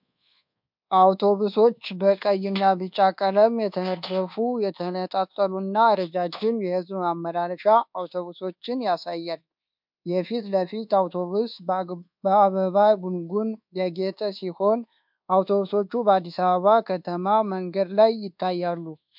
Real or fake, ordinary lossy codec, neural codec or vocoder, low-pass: fake; MP3, 48 kbps; codec, 24 kHz, 1.2 kbps, DualCodec; 5.4 kHz